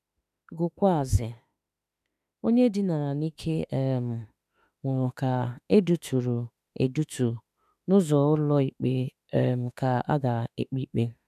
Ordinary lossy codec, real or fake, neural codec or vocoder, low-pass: none; fake; autoencoder, 48 kHz, 32 numbers a frame, DAC-VAE, trained on Japanese speech; 14.4 kHz